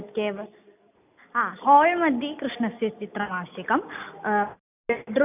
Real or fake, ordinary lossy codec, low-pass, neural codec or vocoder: real; none; 3.6 kHz; none